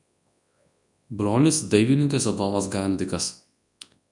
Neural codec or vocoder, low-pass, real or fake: codec, 24 kHz, 0.9 kbps, WavTokenizer, large speech release; 10.8 kHz; fake